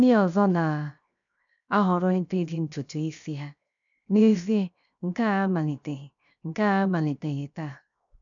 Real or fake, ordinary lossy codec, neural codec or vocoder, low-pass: fake; none; codec, 16 kHz, 0.7 kbps, FocalCodec; 7.2 kHz